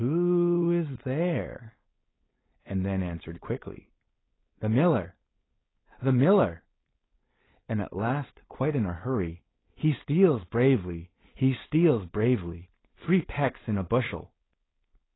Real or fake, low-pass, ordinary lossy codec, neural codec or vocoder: fake; 7.2 kHz; AAC, 16 kbps; codec, 16 kHz, 4.8 kbps, FACodec